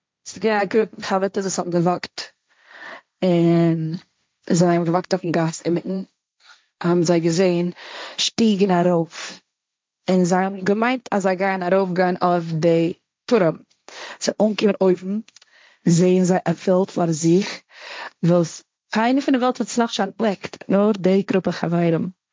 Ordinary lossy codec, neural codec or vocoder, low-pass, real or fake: none; codec, 16 kHz, 1.1 kbps, Voila-Tokenizer; none; fake